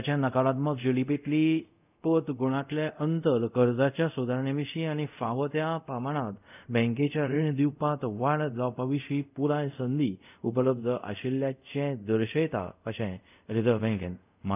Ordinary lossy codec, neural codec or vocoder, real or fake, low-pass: none; codec, 24 kHz, 0.5 kbps, DualCodec; fake; 3.6 kHz